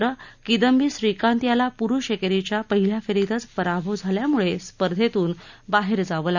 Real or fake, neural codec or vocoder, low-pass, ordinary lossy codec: real; none; 7.2 kHz; none